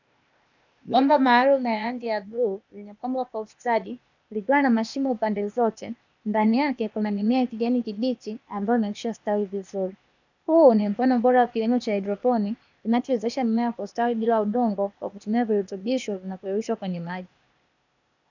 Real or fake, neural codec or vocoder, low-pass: fake; codec, 16 kHz, 0.8 kbps, ZipCodec; 7.2 kHz